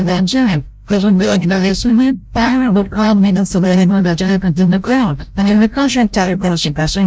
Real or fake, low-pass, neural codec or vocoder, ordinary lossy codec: fake; none; codec, 16 kHz, 0.5 kbps, FreqCodec, larger model; none